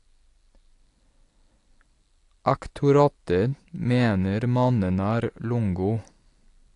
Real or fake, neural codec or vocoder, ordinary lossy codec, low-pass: real; none; AAC, 48 kbps; 10.8 kHz